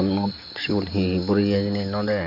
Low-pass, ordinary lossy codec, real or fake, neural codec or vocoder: 5.4 kHz; none; real; none